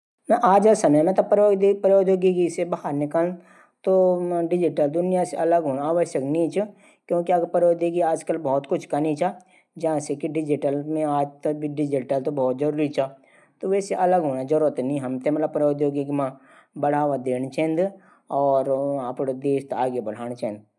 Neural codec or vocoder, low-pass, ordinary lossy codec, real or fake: none; none; none; real